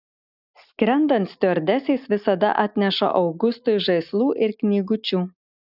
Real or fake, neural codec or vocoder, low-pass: real; none; 5.4 kHz